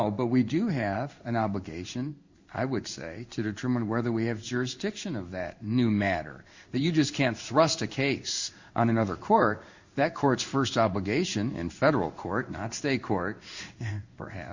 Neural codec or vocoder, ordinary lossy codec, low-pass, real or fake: codec, 16 kHz in and 24 kHz out, 1 kbps, XY-Tokenizer; Opus, 64 kbps; 7.2 kHz; fake